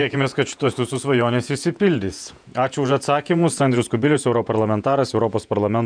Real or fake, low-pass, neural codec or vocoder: fake; 9.9 kHz; vocoder, 48 kHz, 128 mel bands, Vocos